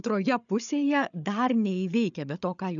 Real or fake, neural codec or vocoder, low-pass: fake; codec, 16 kHz, 8 kbps, FreqCodec, larger model; 7.2 kHz